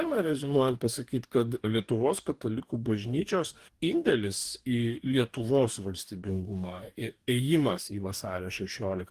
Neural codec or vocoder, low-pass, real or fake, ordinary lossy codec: codec, 44.1 kHz, 2.6 kbps, DAC; 14.4 kHz; fake; Opus, 24 kbps